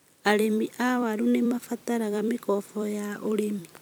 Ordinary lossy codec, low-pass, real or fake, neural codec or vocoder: none; none; fake; vocoder, 44.1 kHz, 128 mel bands every 256 samples, BigVGAN v2